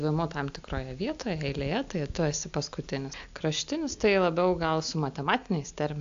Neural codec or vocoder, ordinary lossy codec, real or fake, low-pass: none; AAC, 64 kbps; real; 7.2 kHz